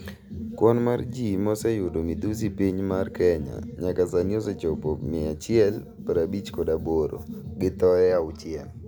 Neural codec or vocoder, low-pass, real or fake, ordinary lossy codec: none; none; real; none